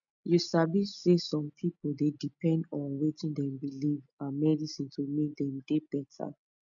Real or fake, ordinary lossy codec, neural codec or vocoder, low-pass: real; none; none; 7.2 kHz